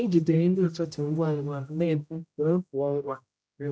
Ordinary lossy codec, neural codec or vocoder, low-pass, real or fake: none; codec, 16 kHz, 0.5 kbps, X-Codec, HuBERT features, trained on general audio; none; fake